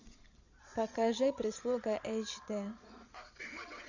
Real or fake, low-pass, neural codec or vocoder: fake; 7.2 kHz; vocoder, 22.05 kHz, 80 mel bands, Vocos